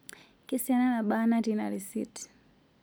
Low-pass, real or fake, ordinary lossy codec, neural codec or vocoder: none; real; none; none